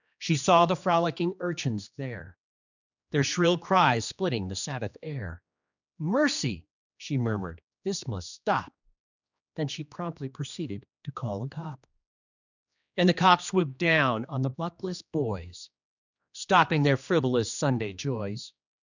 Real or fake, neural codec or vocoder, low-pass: fake; codec, 16 kHz, 2 kbps, X-Codec, HuBERT features, trained on general audio; 7.2 kHz